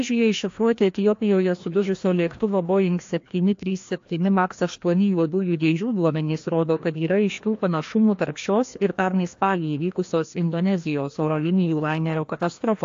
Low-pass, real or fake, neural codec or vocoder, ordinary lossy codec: 7.2 kHz; fake; codec, 16 kHz, 1 kbps, FreqCodec, larger model; AAC, 48 kbps